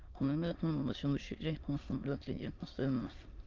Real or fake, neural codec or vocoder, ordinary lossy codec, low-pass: fake; autoencoder, 22.05 kHz, a latent of 192 numbers a frame, VITS, trained on many speakers; Opus, 24 kbps; 7.2 kHz